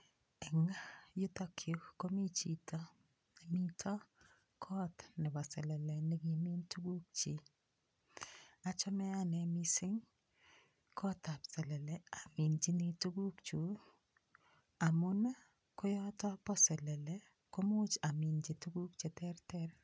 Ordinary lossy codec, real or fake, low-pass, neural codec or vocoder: none; real; none; none